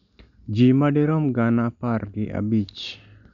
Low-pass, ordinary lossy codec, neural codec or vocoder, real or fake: 7.2 kHz; none; none; real